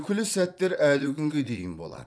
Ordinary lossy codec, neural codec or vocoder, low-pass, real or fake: none; vocoder, 22.05 kHz, 80 mel bands, Vocos; none; fake